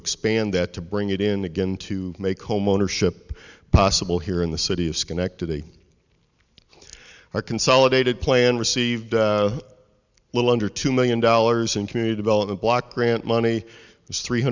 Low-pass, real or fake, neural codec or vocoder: 7.2 kHz; real; none